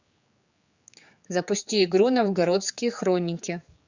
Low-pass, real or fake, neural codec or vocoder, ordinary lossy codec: 7.2 kHz; fake; codec, 16 kHz, 4 kbps, X-Codec, HuBERT features, trained on general audio; Opus, 64 kbps